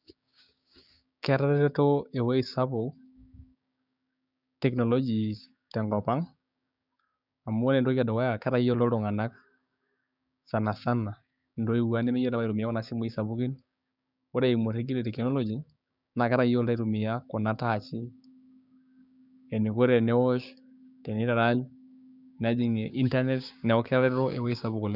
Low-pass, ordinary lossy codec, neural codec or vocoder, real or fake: 5.4 kHz; none; codec, 16 kHz, 6 kbps, DAC; fake